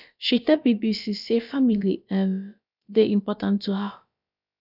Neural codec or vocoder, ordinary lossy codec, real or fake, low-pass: codec, 16 kHz, about 1 kbps, DyCAST, with the encoder's durations; none; fake; 5.4 kHz